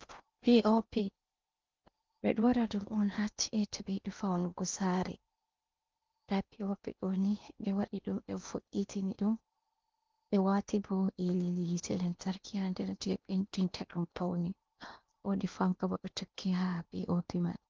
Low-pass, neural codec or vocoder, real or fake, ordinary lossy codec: 7.2 kHz; codec, 16 kHz in and 24 kHz out, 0.8 kbps, FocalCodec, streaming, 65536 codes; fake; Opus, 32 kbps